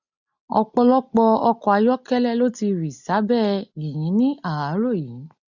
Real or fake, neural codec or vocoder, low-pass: real; none; 7.2 kHz